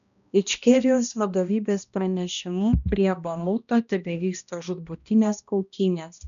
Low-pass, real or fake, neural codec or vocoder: 7.2 kHz; fake; codec, 16 kHz, 1 kbps, X-Codec, HuBERT features, trained on balanced general audio